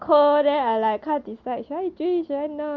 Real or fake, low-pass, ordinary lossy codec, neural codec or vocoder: real; 7.2 kHz; Opus, 32 kbps; none